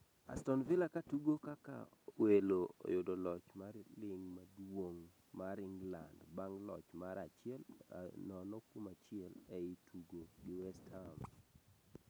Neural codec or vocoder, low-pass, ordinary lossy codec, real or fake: none; none; none; real